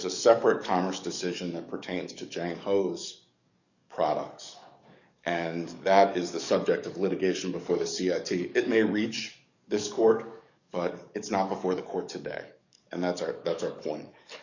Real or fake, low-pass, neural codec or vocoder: fake; 7.2 kHz; codec, 44.1 kHz, 7.8 kbps, DAC